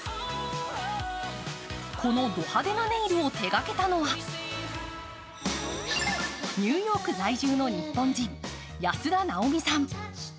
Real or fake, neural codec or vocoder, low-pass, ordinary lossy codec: real; none; none; none